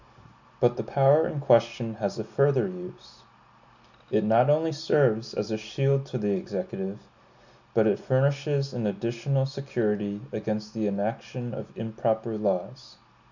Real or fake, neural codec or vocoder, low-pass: real; none; 7.2 kHz